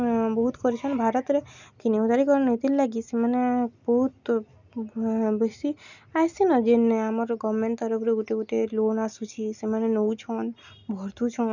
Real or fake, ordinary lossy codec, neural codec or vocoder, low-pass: real; none; none; 7.2 kHz